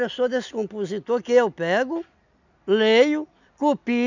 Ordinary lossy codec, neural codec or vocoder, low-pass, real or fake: none; none; 7.2 kHz; real